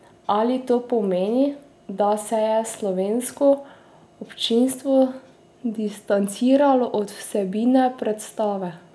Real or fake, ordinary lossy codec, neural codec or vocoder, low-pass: real; none; none; none